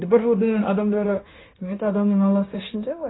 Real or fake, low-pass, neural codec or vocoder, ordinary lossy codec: fake; 7.2 kHz; codec, 16 kHz, 0.9 kbps, LongCat-Audio-Codec; AAC, 16 kbps